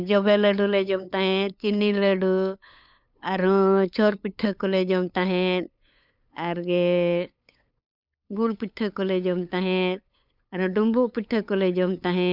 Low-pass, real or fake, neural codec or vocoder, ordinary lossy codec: 5.4 kHz; fake; codec, 16 kHz, 8 kbps, FunCodec, trained on LibriTTS, 25 frames a second; none